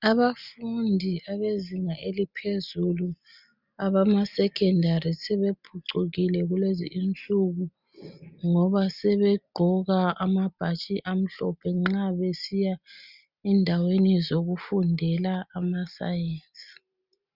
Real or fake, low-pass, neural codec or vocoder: real; 5.4 kHz; none